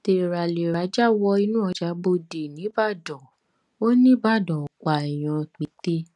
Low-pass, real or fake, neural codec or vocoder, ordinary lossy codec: 10.8 kHz; real; none; none